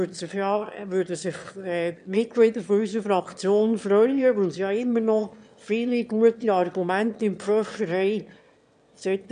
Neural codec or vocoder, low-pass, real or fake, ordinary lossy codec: autoencoder, 22.05 kHz, a latent of 192 numbers a frame, VITS, trained on one speaker; 9.9 kHz; fake; none